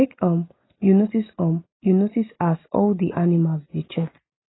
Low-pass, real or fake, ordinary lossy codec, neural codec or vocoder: 7.2 kHz; real; AAC, 16 kbps; none